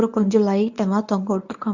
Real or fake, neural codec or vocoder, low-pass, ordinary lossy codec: fake; codec, 24 kHz, 0.9 kbps, WavTokenizer, medium speech release version 1; 7.2 kHz; none